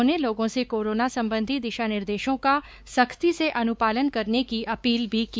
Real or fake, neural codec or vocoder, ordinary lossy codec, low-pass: fake; codec, 16 kHz, 4 kbps, X-Codec, WavLM features, trained on Multilingual LibriSpeech; none; none